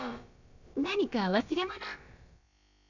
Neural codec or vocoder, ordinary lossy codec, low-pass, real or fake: codec, 16 kHz, about 1 kbps, DyCAST, with the encoder's durations; none; 7.2 kHz; fake